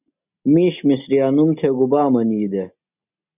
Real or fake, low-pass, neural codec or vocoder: real; 3.6 kHz; none